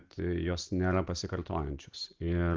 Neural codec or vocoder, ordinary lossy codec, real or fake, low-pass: none; Opus, 16 kbps; real; 7.2 kHz